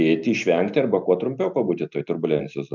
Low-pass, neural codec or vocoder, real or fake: 7.2 kHz; none; real